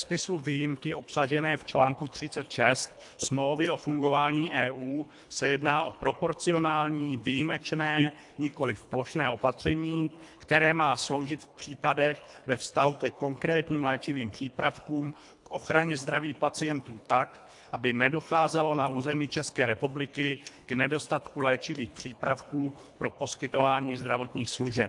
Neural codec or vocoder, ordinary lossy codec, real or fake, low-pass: codec, 24 kHz, 1.5 kbps, HILCodec; AAC, 64 kbps; fake; 10.8 kHz